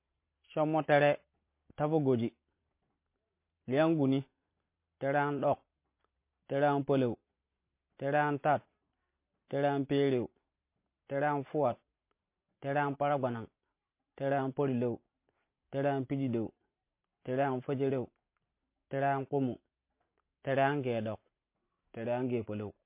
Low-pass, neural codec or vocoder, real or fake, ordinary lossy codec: 3.6 kHz; vocoder, 44.1 kHz, 128 mel bands every 512 samples, BigVGAN v2; fake; MP3, 24 kbps